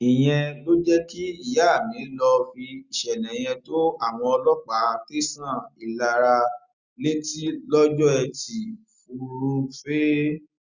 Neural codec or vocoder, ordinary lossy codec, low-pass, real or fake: none; Opus, 64 kbps; 7.2 kHz; real